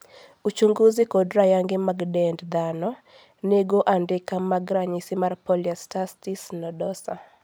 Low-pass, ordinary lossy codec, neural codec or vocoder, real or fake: none; none; none; real